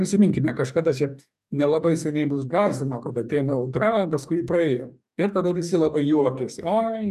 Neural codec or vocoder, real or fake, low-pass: codec, 44.1 kHz, 2.6 kbps, DAC; fake; 14.4 kHz